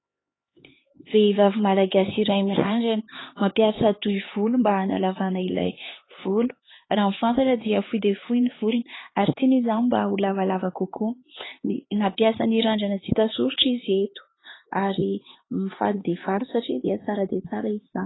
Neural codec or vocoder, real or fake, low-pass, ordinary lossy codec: codec, 16 kHz, 4 kbps, X-Codec, HuBERT features, trained on LibriSpeech; fake; 7.2 kHz; AAC, 16 kbps